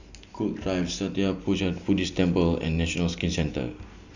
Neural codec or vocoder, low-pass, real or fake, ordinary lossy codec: none; 7.2 kHz; real; none